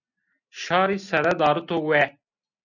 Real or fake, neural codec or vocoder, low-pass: real; none; 7.2 kHz